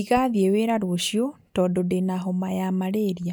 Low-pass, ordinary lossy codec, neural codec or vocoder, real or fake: none; none; none; real